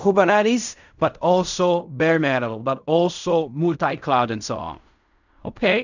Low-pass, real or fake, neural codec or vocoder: 7.2 kHz; fake; codec, 16 kHz in and 24 kHz out, 0.4 kbps, LongCat-Audio-Codec, fine tuned four codebook decoder